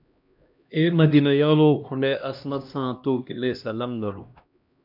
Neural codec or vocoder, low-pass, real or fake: codec, 16 kHz, 1 kbps, X-Codec, HuBERT features, trained on LibriSpeech; 5.4 kHz; fake